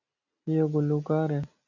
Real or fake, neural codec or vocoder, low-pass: real; none; 7.2 kHz